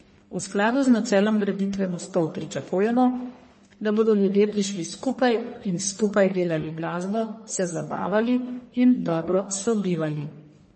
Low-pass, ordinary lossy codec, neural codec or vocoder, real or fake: 10.8 kHz; MP3, 32 kbps; codec, 44.1 kHz, 1.7 kbps, Pupu-Codec; fake